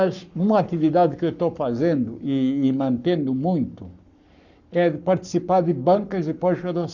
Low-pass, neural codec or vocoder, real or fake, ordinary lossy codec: 7.2 kHz; codec, 44.1 kHz, 7.8 kbps, Pupu-Codec; fake; none